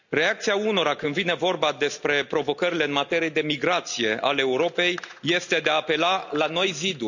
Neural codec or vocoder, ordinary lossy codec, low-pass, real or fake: none; none; 7.2 kHz; real